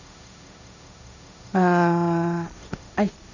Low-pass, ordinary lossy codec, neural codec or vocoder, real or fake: 7.2 kHz; none; codec, 16 kHz, 1.1 kbps, Voila-Tokenizer; fake